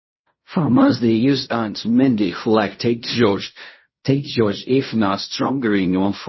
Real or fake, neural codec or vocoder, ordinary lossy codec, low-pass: fake; codec, 16 kHz in and 24 kHz out, 0.4 kbps, LongCat-Audio-Codec, fine tuned four codebook decoder; MP3, 24 kbps; 7.2 kHz